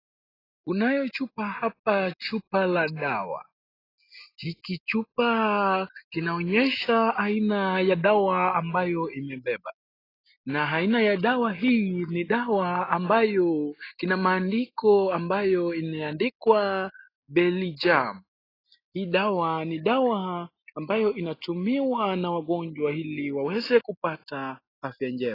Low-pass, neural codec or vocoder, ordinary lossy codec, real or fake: 5.4 kHz; none; AAC, 24 kbps; real